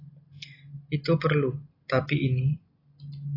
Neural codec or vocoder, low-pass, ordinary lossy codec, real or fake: none; 5.4 kHz; AAC, 24 kbps; real